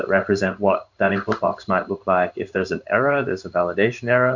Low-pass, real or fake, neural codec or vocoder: 7.2 kHz; fake; codec, 16 kHz in and 24 kHz out, 1 kbps, XY-Tokenizer